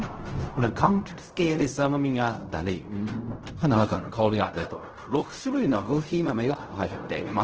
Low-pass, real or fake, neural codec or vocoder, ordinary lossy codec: 7.2 kHz; fake; codec, 16 kHz in and 24 kHz out, 0.4 kbps, LongCat-Audio-Codec, fine tuned four codebook decoder; Opus, 16 kbps